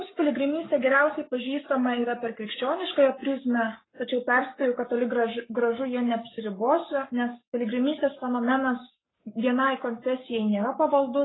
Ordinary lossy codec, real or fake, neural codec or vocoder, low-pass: AAC, 16 kbps; fake; codec, 44.1 kHz, 7.8 kbps, Pupu-Codec; 7.2 kHz